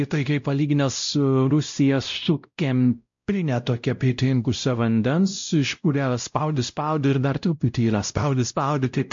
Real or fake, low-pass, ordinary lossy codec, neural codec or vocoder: fake; 7.2 kHz; MP3, 48 kbps; codec, 16 kHz, 0.5 kbps, X-Codec, WavLM features, trained on Multilingual LibriSpeech